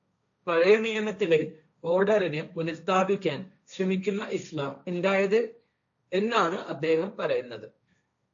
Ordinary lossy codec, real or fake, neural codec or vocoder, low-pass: AAC, 64 kbps; fake; codec, 16 kHz, 1.1 kbps, Voila-Tokenizer; 7.2 kHz